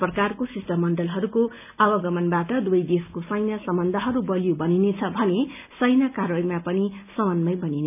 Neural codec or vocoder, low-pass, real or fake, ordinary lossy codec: none; 3.6 kHz; real; none